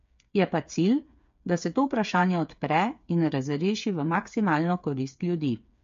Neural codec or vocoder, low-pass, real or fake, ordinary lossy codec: codec, 16 kHz, 8 kbps, FreqCodec, smaller model; 7.2 kHz; fake; MP3, 48 kbps